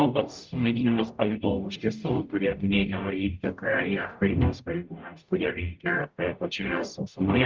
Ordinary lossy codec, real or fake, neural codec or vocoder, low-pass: Opus, 24 kbps; fake; codec, 44.1 kHz, 0.9 kbps, DAC; 7.2 kHz